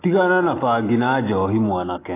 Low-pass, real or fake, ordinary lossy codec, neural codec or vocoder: 3.6 kHz; real; AAC, 16 kbps; none